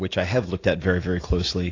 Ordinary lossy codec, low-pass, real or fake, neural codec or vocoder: AAC, 32 kbps; 7.2 kHz; real; none